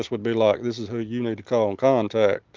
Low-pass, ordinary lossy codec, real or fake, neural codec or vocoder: 7.2 kHz; Opus, 32 kbps; real; none